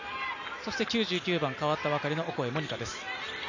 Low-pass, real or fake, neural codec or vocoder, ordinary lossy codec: 7.2 kHz; real; none; none